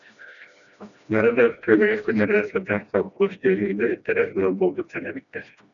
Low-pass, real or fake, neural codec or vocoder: 7.2 kHz; fake; codec, 16 kHz, 1 kbps, FreqCodec, smaller model